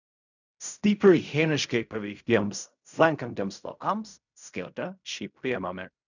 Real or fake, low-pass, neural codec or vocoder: fake; 7.2 kHz; codec, 16 kHz in and 24 kHz out, 0.4 kbps, LongCat-Audio-Codec, fine tuned four codebook decoder